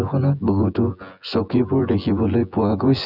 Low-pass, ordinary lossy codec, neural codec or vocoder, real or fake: 5.4 kHz; none; vocoder, 24 kHz, 100 mel bands, Vocos; fake